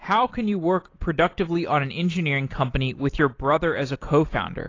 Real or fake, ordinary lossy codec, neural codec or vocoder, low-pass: real; AAC, 32 kbps; none; 7.2 kHz